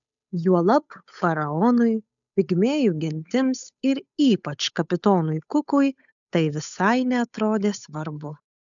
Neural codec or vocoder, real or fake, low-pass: codec, 16 kHz, 8 kbps, FunCodec, trained on Chinese and English, 25 frames a second; fake; 7.2 kHz